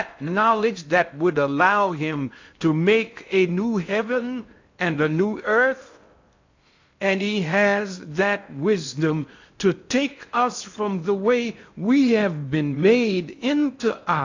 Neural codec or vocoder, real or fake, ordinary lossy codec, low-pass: codec, 16 kHz in and 24 kHz out, 0.6 kbps, FocalCodec, streaming, 4096 codes; fake; AAC, 48 kbps; 7.2 kHz